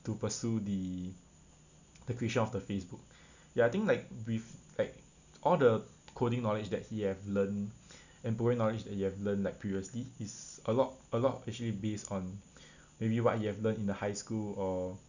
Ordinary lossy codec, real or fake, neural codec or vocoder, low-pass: none; real; none; 7.2 kHz